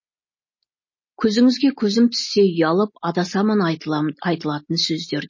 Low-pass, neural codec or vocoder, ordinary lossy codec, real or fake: 7.2 kHz; none; MP3, 32 kbps; real